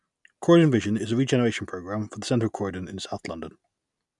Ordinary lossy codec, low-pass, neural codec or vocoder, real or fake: none; 10.8 kHz; none; real